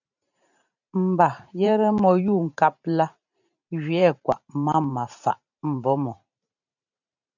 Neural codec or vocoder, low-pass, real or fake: vocoder, 44.1 kHz, 128 mel bands every 512 samples, BigVGAN v2; 7.2 kHz; fake